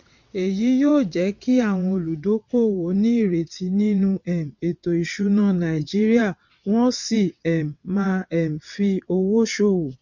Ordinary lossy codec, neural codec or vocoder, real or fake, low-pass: MP3, 48 kbps; vocoder, 22.05 kHz, 80 mel bands, WaveNeXt; fake; 7.2 kHz